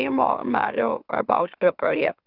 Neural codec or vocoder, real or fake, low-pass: autoencoder, 44.1 kHz, a latent of 192 numbers a frame, MeloTTS; fake; 5.4 kHz